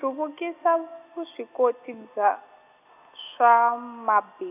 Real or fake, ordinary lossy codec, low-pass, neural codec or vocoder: real; none; 3.6 kHz; none